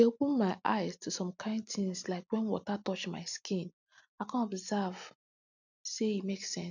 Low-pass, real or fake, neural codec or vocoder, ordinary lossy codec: 7.2 kHz; real; none; none